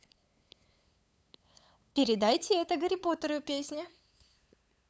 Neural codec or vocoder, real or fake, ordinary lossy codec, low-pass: codec, 16 kHz, 8 kbps, FunCodec, trained on LibriTTS, 25 frames a second; fake; none; none